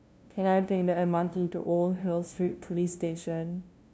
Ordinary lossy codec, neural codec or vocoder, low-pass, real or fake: none; codec, 16 kHz, 0.5 kbps, FunCodec, trained on LibriTTS, 25 frames a second; none; fake